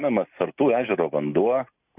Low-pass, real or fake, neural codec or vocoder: 3.6 kHz; real; none